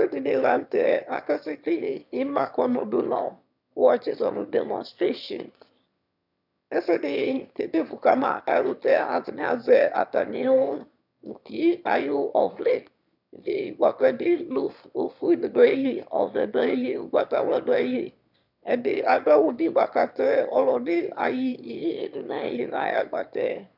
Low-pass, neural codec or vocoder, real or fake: 5.4 kHz; autoencoder, 22.05 kHz, a latent of 192 numbers a frame, VITS, trained on one speaker; fake